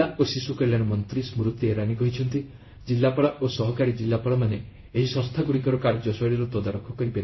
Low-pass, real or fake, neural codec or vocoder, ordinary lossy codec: 7.2 kHz; fake; codec, 16 kHz in and 24 kHz out, 1 kbps, XY-Tokenizer; MP3, 24 kbps